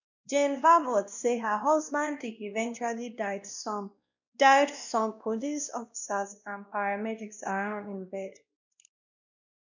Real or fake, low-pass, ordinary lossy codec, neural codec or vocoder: fake; 7.2 kHz; none; codec, 16 kHz, 1 kbps, X-Codec, WavLM features, trained on Multilingual LibriSpeech